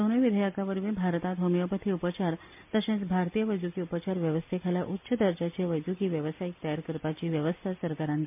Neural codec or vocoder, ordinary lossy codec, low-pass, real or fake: none; MP3, 32 kbps; 3.6 kHz; real